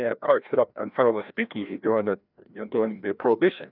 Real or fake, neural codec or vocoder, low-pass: fake; codec, 16 kHz, 1 kbps, FreqCodec, larger model; 5.4 kHz